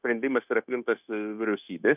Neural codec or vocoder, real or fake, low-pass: codec, 16 kHz, 0.9 kbps, LongCat-Audio-Codec; fake; 3.6 kHz